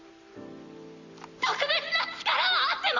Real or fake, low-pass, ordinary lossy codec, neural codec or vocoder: real; 7.2 kHz; none; none